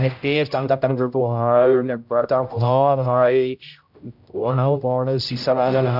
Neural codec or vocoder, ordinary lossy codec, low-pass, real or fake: codec, 16 kHz, 0.5 kbps, X-Codec, HuBERT features, trained on general audio; none; 5.4 kHz; fake